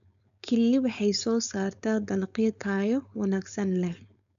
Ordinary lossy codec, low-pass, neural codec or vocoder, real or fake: none; 7.2 kHz; codec, 16 kHz, 4.8 kbps, FACodec; fake